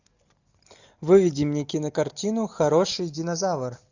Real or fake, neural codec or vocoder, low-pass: real; none; 7.2 kHz